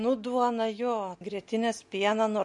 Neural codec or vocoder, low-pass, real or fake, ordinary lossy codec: none; 10.8 kHz; real; MP3, 48 kbps